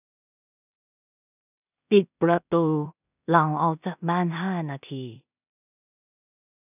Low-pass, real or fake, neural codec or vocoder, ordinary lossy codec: 3.6 kHz; fake; codec, 16 kHz in and 24 kHz out, 0.4 kbps, LongCat-Audio-Codec, two codebook decoder; none